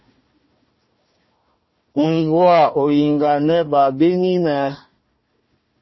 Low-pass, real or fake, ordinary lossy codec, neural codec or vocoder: 7.2 kHz; fake; MP3, 24 kbps; codec, 16 kHz, 1 kbps, FunCodec, trained on Chinese and English, 50 frames a second